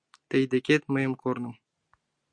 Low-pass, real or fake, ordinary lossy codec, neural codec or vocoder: 9.9 kHz; real; Opus, 64 kbps; none